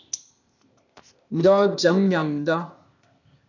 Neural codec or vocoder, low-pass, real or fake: codec, 16 kHz, 0.8 kbps, ZipCodec; 7.2 kHz; fake